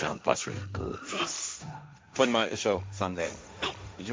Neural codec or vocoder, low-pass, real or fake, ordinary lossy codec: codec, 16 kHz, 1.1 kbps, Voila-Tokenizer; none; fake; none